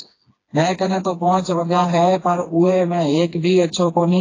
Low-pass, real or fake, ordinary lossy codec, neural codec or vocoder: 7.2 kHz; fake; AAC, 32 kbps; codec, 16 kHz, 2 kbps, FreqCodec, smaller model